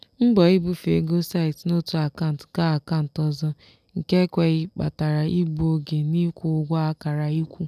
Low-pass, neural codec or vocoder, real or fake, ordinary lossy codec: 14.4 kHz; none; real; none